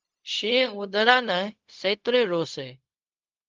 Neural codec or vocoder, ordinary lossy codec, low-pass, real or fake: codec, 16 kHz, 0.4 kbps, LongCat-Audio-Codec; Opus, 32 kbps; 7.2 kHz; fake